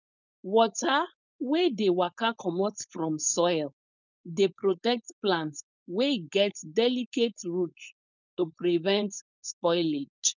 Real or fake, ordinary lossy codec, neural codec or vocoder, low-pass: fake; none; codec, 16 kHz, 4.8 kbps, FACodec; 7.2 kHz